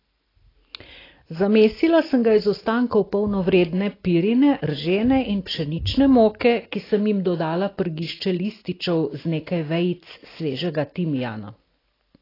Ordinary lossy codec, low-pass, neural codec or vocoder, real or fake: AAC, 24 kbps; 5.4 kHz; none; real